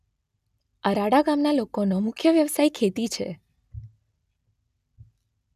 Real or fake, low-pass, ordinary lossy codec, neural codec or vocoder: real; 14.4 kHz; none; none